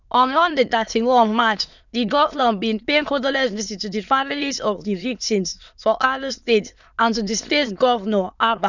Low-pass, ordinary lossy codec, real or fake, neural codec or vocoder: 7.2 kHz; none; fake; autoencoder, 22.05 kHz, a latent of 192 numbers a frame, VITS, trained on many speakers